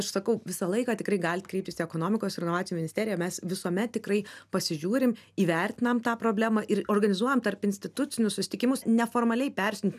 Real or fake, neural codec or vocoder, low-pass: real; none; 14.4 kHz